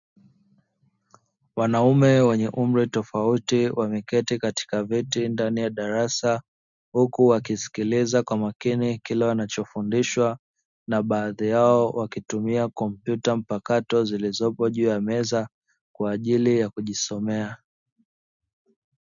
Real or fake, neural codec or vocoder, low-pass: real; none; 7.2 kHz